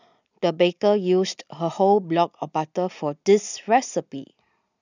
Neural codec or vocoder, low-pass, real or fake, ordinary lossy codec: none; 7.2 kHz; real; none